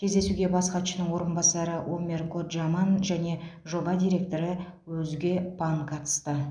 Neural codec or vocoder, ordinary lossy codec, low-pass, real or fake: none; none; 9.9 kHz; real